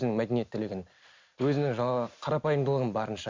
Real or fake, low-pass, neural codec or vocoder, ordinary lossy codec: real; 7.2 kHz; none; MP3, 64 kbps